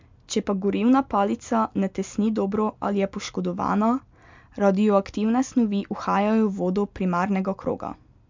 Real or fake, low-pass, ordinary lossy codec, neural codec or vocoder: real; 7.2 kHz; MP3, 64 kbps; none